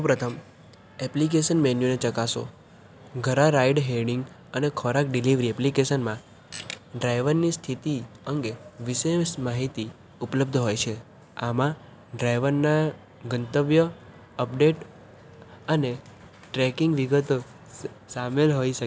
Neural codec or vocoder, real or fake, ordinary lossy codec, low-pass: none; real; none; none